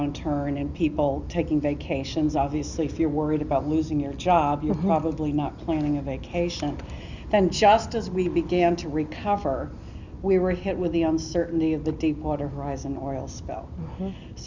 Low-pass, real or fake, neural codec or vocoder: 7.2 kHz; real; none